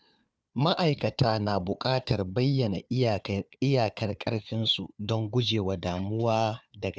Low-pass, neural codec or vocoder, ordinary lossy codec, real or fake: none; codec, 16 kHz, 4 kbps, FunCodec, trained on Chinese and English, 50 frames a second; none; fake